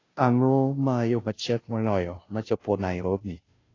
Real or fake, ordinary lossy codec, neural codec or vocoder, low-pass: fake; AAC, 32 kbps; codec, 16 kHz, 0.5 kbps, FunCodec, trained on Chinese and English, 25 frames a second; 7.2 kHz